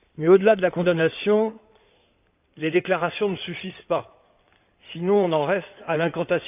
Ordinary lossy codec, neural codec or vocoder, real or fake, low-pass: none; codec, 16 kHz in and 24 kHz out, 2.2 kbps, FireRedTTS-2 codec; fake; 3.6 kHz